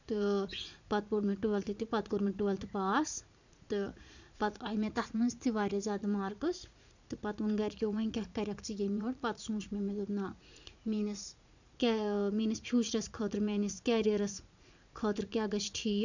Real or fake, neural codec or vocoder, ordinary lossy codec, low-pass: fake; vocoder, 44.1 kHz, 80 mel bands, Vocos; none; 7.2 kHz